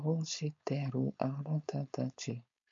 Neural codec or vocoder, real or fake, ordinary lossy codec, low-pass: codec, 16 kHz, 4.8 kbps, FACodec; fake; MP3, 48 kbps; 7.2 kHz